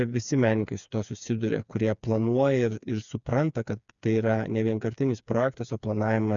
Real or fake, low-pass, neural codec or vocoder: fake; 7.2 kHz; codec, 16 kHz, 4 kbps, FreqCodec, smaller model